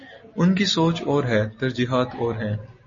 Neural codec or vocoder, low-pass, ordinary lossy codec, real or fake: none; 7.2 kHz; MP3, 32 kbps; real